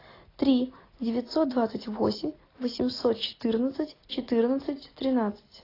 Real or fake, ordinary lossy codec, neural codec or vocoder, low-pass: real; AAC, 24 kbps; none; 5.4 kHz